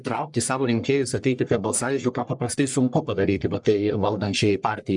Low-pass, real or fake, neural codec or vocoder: 10.8 kHz; fake; codec, 44.1 kHz, 1.7 kbps, Pupu-Codec